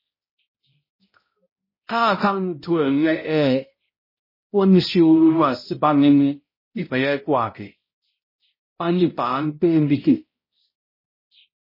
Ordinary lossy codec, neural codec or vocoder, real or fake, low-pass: MP3, 24 kbps; codec, 16 kHz, 0.5 kbps, X-Codec, HuBERT features, trained on balanced general audio; fake; 5.4 kHz